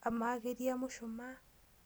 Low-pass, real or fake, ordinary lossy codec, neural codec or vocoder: none; real; none; none